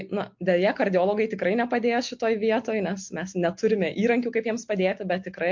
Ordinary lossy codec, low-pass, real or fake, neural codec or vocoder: MP3, 48 kbps; 7.2 kHz; real; none